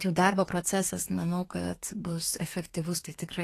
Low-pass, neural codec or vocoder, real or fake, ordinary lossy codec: 14.4 kHz; codec, 32 kHz, 1.9 kbps, SNAC; fake; AAC, 48 kbps